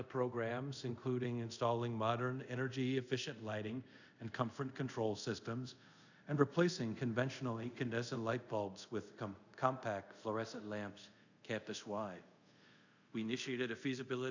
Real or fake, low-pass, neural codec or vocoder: fake; 7.2 kHz; codec, 24 kHz, 0.5 kbps, DualCodec